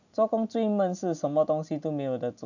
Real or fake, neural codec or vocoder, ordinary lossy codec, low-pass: real; none; none; 7.2 kHz